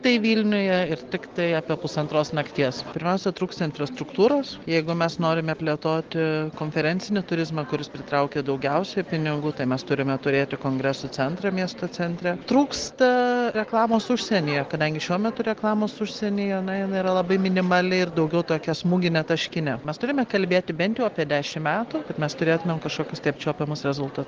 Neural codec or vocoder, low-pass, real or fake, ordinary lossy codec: none; 7.2 kHz; real; Opus, 16 kbps